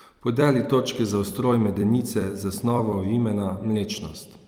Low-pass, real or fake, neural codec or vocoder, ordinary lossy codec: 19.8 kHz; fake; vocoder, 44.1 kHz, 128 mel bands every 256 samples, BigVGAN v2; Opus, 32 kbps